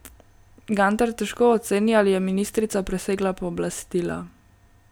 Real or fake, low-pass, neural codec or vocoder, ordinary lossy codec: real; none; none; none